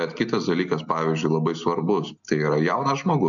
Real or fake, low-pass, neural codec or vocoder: real; 7.2 kHz; none